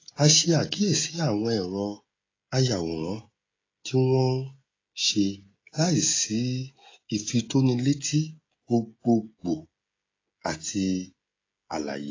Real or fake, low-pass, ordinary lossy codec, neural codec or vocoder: fake; 7.2 kHz; AAC, 32 kbps; codec, 16 kHz, 16 kbps, FreqCodec, smaller model